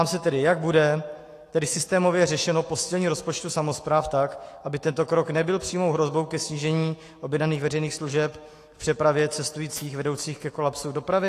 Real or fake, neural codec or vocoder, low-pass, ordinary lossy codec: fake; autoencoder, 48 kHz, 128 numbers a frame, DAC-VAE, trained on Japanese speech; 14.4 kHz; AAC, 48 kbps